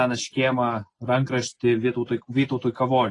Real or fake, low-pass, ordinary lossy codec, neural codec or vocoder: real; 10.8 kHz; AAC, 32 kbps; none